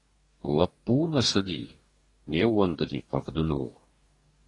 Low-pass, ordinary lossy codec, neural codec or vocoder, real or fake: 10.8 kHz; AAC, 32 kbps; codec, 44.1 kHz, 2.6 kbps, DAC; fake